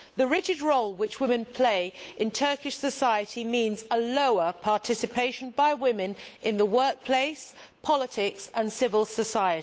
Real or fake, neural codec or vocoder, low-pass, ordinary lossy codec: fake; codec, 16 kHz, 8 kbps, FunCodec, trained on Chinese and English, 25 frames a second; none; none